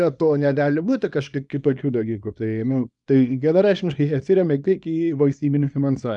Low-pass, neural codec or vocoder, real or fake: 10.8 kHz; codec, 24 kHz, 0.9 kbps, WavTokenizer, small release; fake